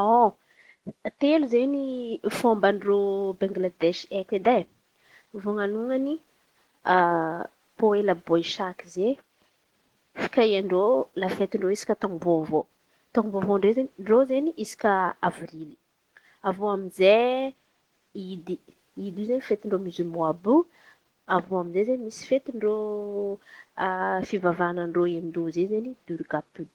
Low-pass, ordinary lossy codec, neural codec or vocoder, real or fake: 19.8 kHz; Opus, 16 kbps; none; real